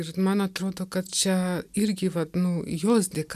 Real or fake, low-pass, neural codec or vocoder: real; 14.4 kHz; none